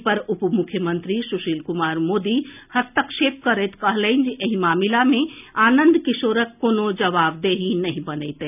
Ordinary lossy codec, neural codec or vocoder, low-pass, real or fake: none; none; 3.6 kHz; real